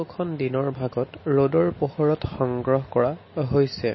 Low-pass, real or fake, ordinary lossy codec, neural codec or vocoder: 7.2 kHz; real; MP3, 24 kbps; none